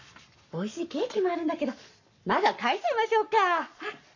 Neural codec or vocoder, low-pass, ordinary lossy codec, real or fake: codec, 44.1 kHz, 7.8 kbps, Pupu-Codec; 7.2 kHz; none; fake